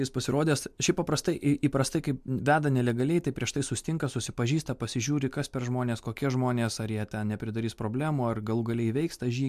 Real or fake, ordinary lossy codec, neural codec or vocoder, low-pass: real; MP3, 96 kbps; none; 14.4 kHz